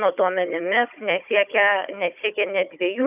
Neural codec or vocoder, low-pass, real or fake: codec, 16 kHz, 16 kbps, FunCodec, trained on Chinese and English, 50 frames a second; 3.6 kHz; fake